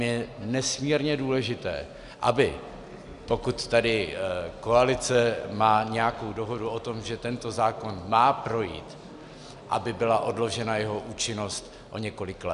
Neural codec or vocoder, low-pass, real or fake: none; 10.8 kHz; real